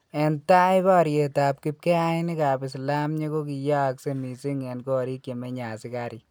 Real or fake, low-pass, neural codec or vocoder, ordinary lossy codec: real; none; none; none